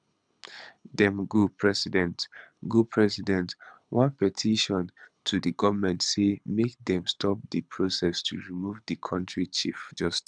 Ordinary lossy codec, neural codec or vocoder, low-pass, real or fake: none; codec, 24 kHz, 6 kbps, HILCodec; 9.9 kHz; fake